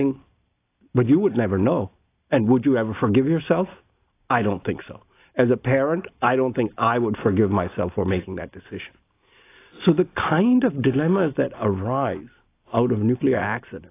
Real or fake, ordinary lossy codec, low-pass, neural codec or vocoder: real; AAC, 24 kbps; 3.6 kHz; none